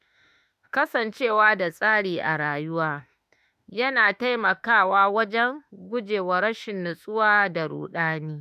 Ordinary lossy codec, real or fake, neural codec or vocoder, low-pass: none; fake; autoencoder, 48 kHz, 32 numbers a frame, DAC-VAE, trained on Japanese speech; 14.4 kHz